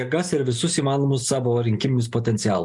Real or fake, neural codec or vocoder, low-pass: real; none; 10.8 kHz